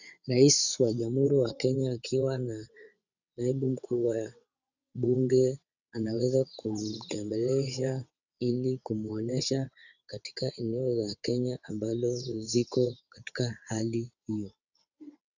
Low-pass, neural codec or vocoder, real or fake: 7.2 kHz; vocoder, 22.05 kHz, 80 mel bands, WaveNeXt; fake